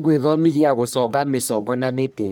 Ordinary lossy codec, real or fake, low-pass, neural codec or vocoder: none; fake; none; codec, 44.1 kHz, 1.7 kbps, Pupu-Codec